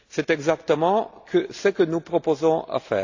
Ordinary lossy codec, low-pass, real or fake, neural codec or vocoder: AAC, 48 kbps; 7.2 kHz; real; none